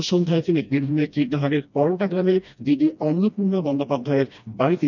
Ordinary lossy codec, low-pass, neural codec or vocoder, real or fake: none; 7.2 kHz; codec, 16 kHz, 1 kbps, FreqCodec, smaller model; fake